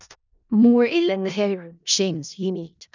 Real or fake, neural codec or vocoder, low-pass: fake; codec, 16 kHz in and 24 kHz out, 0.4 kbps, LongCat-Audio-Codec, four codebook decoder; 7.2 kHz